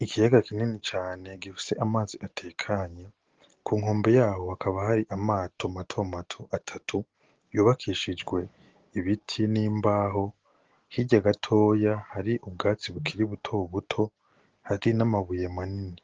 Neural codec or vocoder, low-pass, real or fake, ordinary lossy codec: none; 7.2 kHz; real; Opus, 16 kbps